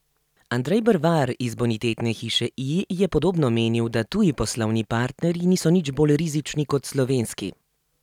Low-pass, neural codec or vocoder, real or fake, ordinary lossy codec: 19.8 kHz; none; real; none